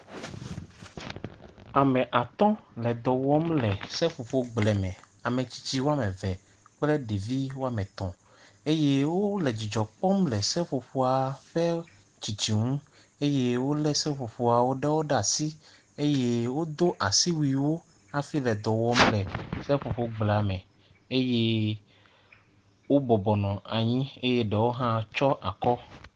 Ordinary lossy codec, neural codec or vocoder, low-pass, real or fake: Opus, 16 kbps; none; 9.9 kHz; real